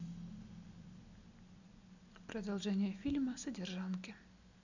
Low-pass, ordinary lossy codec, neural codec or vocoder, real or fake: 7.2 kHz; none; none; real